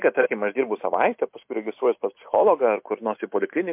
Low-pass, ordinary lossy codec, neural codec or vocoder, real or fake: 3.6 kHz; MP3, 32 kbps; none; real